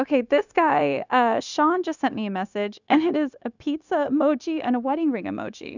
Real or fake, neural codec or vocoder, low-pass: fake; codec, 16 kHz, 0.9 kbps, LongCat-Audio-Codec; 7.2 kHz